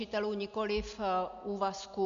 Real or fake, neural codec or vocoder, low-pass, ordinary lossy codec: real; none; 7.2 kHz; MP3, 64 kbps